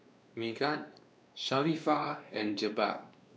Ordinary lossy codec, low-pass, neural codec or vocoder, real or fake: none; none; codec, 16 kHz, 2 kbps, X-Codec, WavLM features, trained on Multilingual LibriSpeech; fake